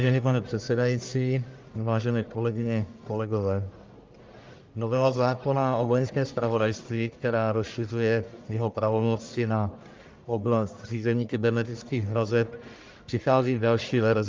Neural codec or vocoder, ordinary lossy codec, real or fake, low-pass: codec, 44.1 kHz, 1.7 kbps, Pupu-Codec; Opus, 24 kbps; fake; 7.2 kHz